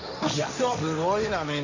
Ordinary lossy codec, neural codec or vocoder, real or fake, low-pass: none; codec, 16 kHz, 1.1 kbps, Voila-Tokenizer; fake; 7.2 kHz